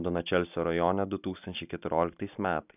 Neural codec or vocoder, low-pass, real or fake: none; 3.6 kHz; real